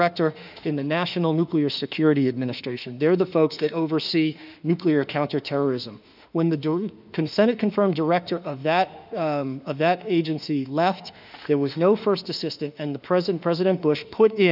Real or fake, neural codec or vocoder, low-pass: fake; autoencoder, 48 kHz, 32 numbers a frame, DAC-VAE, trained on Japanese speech; 5.4 kHz